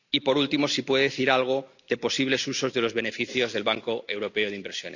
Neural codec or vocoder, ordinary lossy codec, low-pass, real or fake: none; none; 7.2 kHz; real